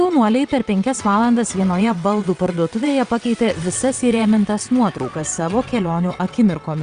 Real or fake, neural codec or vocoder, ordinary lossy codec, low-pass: fake; vocoder, 22.05 kHz, 80 mel bands, WaveNeXt; Opus, 64 kbps; 9.9 kHz